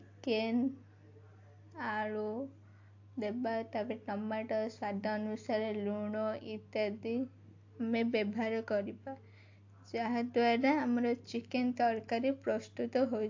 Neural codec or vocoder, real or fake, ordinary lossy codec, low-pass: none; real; AAC, 48 kbps; 7.2 kHz